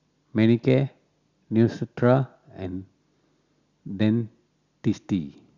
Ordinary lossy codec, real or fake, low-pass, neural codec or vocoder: Opus, 64 kbps; real; 7.2 kHz; none